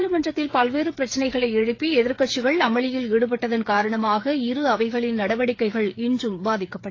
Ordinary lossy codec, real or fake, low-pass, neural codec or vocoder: AAC, 32 kbps; fake; 7.2 kHz; codec, 16 kHz, 8 kbps, FreqCodec, smaller model